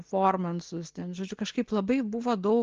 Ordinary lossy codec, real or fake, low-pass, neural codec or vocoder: Opus, 16 kbps; real; 7.2 kHz; none